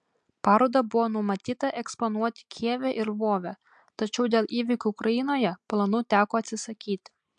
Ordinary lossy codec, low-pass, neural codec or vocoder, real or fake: MP3, 64 kbps; 9.9 kHz; none; real